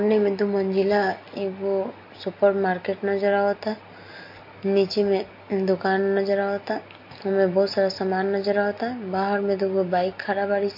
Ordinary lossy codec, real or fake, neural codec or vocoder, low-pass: MP3, 32 kbps; real; none; 5.4 kHz